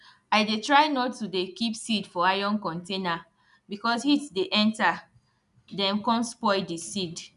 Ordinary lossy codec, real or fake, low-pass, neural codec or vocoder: none; real; 10.8 kHz; none